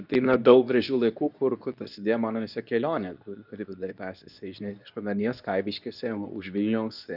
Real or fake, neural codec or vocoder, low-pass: fake; codec, 24 kHz, 0.9 kbps, WavTokenizer, medium speech release version 2; 5.4 kHz